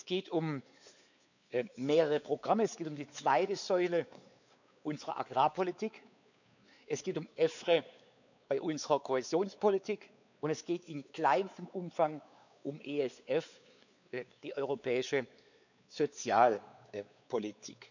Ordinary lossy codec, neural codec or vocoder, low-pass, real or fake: none; codec, 16 kHz, 4 kbps, X-Codec, WavLM features, trained on Multilingual LibriSpeech; 7.2 kHz; fake